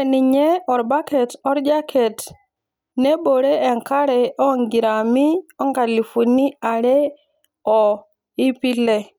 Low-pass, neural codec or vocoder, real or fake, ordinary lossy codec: none; none; real; none